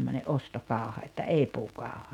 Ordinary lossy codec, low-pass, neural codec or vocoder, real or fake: none; 19.8 kHz; autoencoder, 48 kHz, 128 numbers a frame, DAC-VAE, trained on Japanese speech; fake